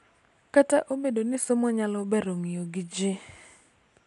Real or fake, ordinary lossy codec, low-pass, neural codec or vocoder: real; none; 10.8 kHz; none